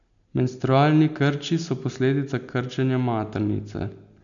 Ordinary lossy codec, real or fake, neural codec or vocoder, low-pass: MP3, 96 kbps; real; none; 7.2 kHz